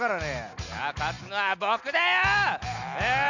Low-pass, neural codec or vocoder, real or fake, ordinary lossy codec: 7.2 kHz; none; real; none